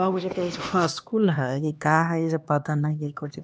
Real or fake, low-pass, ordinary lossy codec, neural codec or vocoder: fake; none; none; codec, 16 kHz, 2 kbps, X-Codec, HuBERT features, trained on LibriSpeech